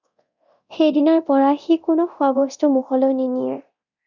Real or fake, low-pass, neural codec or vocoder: fake; 7.2 kHz; codec, 24 kHz, 0.9 kbps, DualCodec